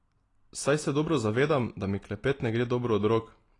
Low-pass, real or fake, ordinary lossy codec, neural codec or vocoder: 10.8 kHz; real; AAC, 32 kbps; none